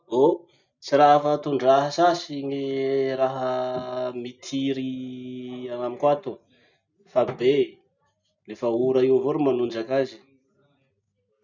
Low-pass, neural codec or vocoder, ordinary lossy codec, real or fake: 7.2 kHz; none; none; real